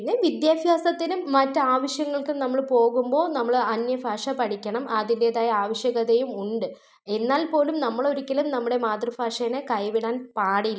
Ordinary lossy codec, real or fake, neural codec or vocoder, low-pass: none; real; none; none